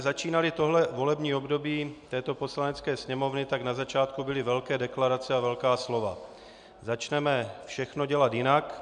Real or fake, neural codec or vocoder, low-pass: real; none; 9.9 kHz